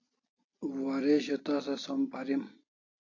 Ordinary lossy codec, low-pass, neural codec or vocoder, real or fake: AAC, 32 kbps; 7.2 kHz; none; real